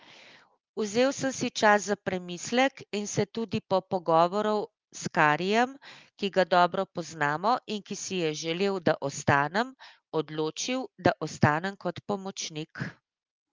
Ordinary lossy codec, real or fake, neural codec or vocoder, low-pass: Opus, 32 kbps; real; none; 7.2 kHz